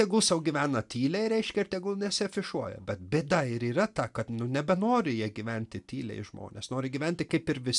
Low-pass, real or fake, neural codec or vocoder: 10.8 kHz; real; none